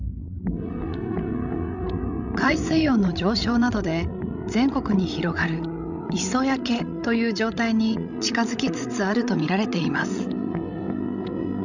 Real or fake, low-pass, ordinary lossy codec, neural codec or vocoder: fake; 7.2 kHz; none; codec, 16 kHz, 16 kbps, FreqCodec, larger model